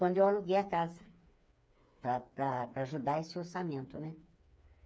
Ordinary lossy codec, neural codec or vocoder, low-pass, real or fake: none; codec, 16 kHz, 4 kbps, FreqCodec, smaller model; none; fake